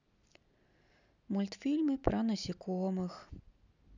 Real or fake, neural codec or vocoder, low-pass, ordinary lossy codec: real; none; 7.2 kHz; none